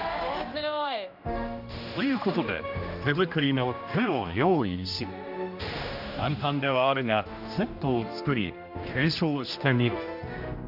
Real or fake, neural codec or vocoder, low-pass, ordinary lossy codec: fake; codec, 16 kHz, 1 kbps, X-Codec, HuBERT features, trained on general audio; 5.4 kHz; none